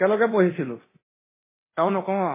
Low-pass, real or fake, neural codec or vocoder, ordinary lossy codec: 3.6 kHz; fake; codec, 24 kHz, 0.9 kbps, DualCodec; MP3, 16 kbps